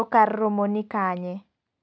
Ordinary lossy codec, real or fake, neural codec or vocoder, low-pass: none; real; none; none